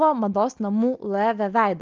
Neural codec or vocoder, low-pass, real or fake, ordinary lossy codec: none; 7.2 kHz; real; Opus, 24 kbps